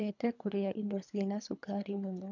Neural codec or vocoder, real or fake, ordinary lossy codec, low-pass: codec, 24 kHz, 3 kbps, HILCodec; fake; none; 7.2 kHz